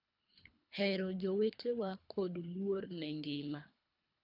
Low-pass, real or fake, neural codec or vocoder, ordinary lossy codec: 5.4 kHz; fake; codec, 24 kHz, 3 kbps, HILCodec; none